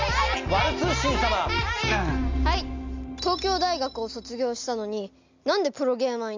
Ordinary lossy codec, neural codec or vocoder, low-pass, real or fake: MP3, 64 kbps; none; 7.2 kHz; real